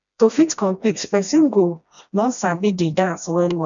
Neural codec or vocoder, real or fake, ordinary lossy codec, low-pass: codec, 16 kHz, 1 kbps, FreqCodec, smaller model; fake; none; 7.2 kHz